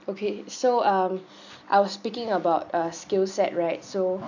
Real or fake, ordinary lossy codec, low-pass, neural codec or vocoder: real; none; 7.2 kHz; none